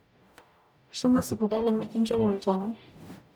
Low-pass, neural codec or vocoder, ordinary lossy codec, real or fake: none; codec, 44.1 kHz, 0.9 kbps, DAC; none; fake